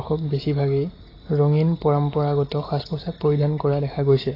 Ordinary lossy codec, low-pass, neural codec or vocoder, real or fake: AAC, 24 kbps; 5.4 kHz; none; real